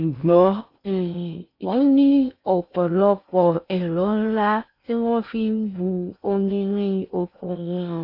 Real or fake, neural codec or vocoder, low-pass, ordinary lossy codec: fake; codec, 16 kHz in and 24 kHz out, 0.6 kbps, FocalCodec, streaming, 4096 codes; 5.4 kHz; none